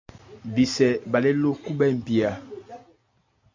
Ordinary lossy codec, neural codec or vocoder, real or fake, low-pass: MP3, 48 kbps; none; real; 7.2 kHz